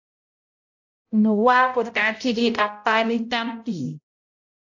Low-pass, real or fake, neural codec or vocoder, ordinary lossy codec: 7.2 kHz; fake; codec, 16 kHz, 0.5 kbps, X-Codec, HuBERT features, trained on balanced general audio; AAC, 48 kbps